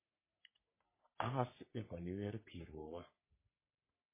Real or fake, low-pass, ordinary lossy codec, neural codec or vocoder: fake; 3.6 kHz; MP3, 16 kbps; codec, 44.1 kHz, 3.4 kbps, Pupu-Codec